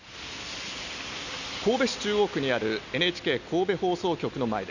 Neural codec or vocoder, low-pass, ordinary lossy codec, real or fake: none; 7.2 kHz; none; real